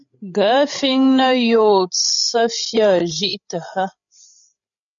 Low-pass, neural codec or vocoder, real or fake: 7.2 kHz; codec, 16 kHz, 16 kbps, FreqCodec, larger model; fake